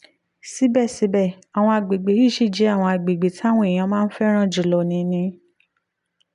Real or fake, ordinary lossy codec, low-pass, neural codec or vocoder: real; none; 10.8 kHz; none